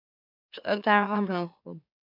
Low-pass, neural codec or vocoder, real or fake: 5.4 kHz; autoencoder, 44.1 kHz, a latent of 192 numbers a frame, MeloTTS; fake